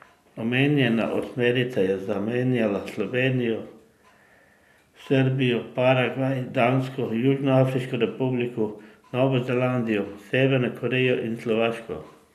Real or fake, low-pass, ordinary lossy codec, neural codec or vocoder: real; 14.4 kHz; none; none